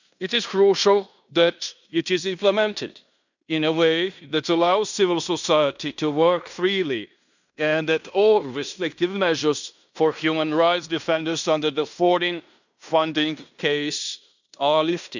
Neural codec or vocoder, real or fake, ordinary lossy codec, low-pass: codec, 16 kHz in and 24 kHz out, 0.9 kbps, LongCat-Audio-Codec, fine tuned four codebook decoder; fake; none; 7.2 kHz